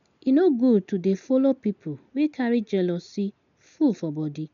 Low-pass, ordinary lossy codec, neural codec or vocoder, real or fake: 7.2 kHz; none; none; real